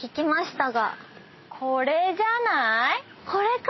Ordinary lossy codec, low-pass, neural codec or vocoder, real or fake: MP3, 24 kbps; 7.2 kHz; none; real